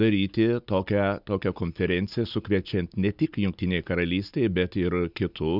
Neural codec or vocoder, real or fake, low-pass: none; real; 5.4 kHz